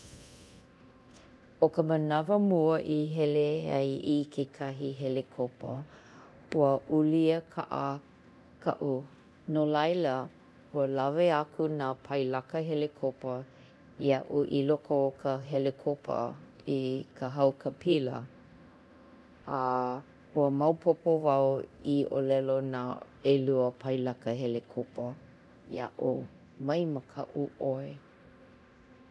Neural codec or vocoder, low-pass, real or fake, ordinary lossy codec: codec, 24 kHz, 0.9 kbps, DualCodec; none; fake; none